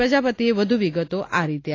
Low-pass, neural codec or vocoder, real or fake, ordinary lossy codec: 7.2 kHz; none; real; AAC, 32 kbps